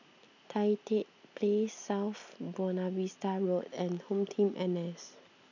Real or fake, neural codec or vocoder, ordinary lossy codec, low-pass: real; none; none; 7.2 kHz